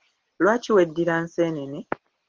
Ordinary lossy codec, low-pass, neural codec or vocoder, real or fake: Opus, 16 kbps; 7.2 kHz; none; real